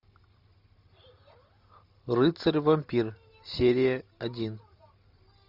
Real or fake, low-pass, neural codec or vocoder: real; 5.4 kHz; none